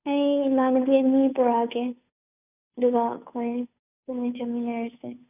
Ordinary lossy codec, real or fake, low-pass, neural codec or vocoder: none; fake; 3.6 kHz; codec, 16 kHz, 2 kbps, FunCodec, trained on Chinese and English, 25 frames a second